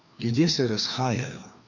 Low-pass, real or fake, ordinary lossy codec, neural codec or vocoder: 7.2 kHz; fake; none; codec, 16 kHz, 2 kbps, FreqCodec, larger model